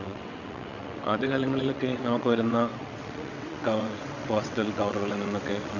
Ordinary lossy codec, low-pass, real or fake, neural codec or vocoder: none; 7.2 kHz; fake; vocoder, 22.05 kHz, 80 mel bands, WaveNeXt